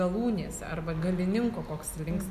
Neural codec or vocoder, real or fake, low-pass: none; real; 14.4 kHz